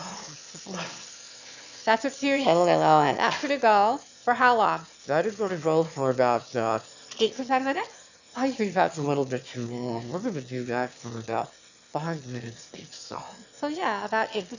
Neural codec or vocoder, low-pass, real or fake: autoencoder, 22.05 kHz, a latent of 192 numbers a frame, VITS, trained on one speaker; 7.2 kHz; fake